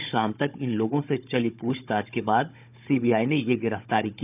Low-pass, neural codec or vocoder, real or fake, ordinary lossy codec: 3.6 kHz; codec, 16 kHz, 16 kbps, FunCodec, trained on Chinese and English, 50 frames a second; fake; none